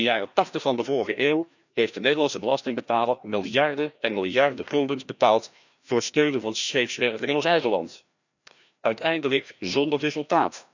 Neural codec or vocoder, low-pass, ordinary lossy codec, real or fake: codec, 16 kHz, 1 kbps, FreqCodec, larger model; 7.2 kHz; none; fake